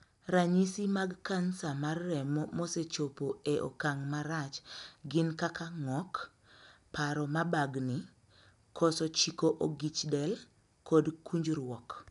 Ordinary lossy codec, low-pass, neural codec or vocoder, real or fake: none; 10.8 kHz; none; real